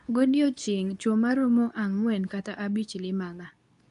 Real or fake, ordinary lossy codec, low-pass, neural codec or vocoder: fake; none; 10.8 kHz; codec, 24 kHz, 0.9 kbps, WavTokenizer, medium speech release version 2